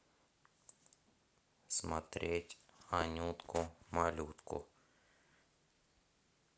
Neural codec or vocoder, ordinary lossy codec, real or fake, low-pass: none; none; real; none